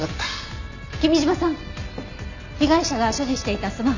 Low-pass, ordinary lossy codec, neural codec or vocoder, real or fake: 7.2 kHz; none; none; real